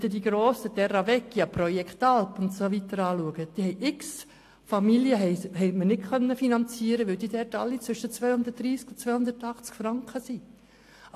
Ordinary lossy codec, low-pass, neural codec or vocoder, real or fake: AAC, 64 kbps; 14.4 kHz; none; real